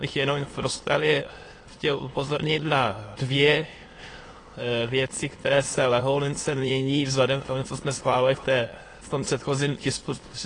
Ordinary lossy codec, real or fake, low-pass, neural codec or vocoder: AAC, 32 kbps; fake; 9.9 kHz; autoencoder, 22.05 kHz, a latent of 192 numbers a frame, VITS, trained on many speakers